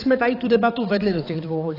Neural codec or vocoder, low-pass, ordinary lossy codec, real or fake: codec, 16 kHz, 4 kbps, X-Codec, HuBERT features, trained on balanced general audio; 5.4 kHz; AAC, 24 kbps; fake